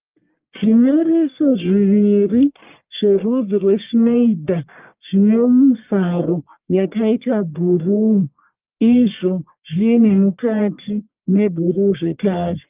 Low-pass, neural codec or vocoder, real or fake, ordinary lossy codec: 3.6 kHz; codec, 44.1 kHz, 1.7 kbps, Pupu-Codec; fake; Opus, 24 kbps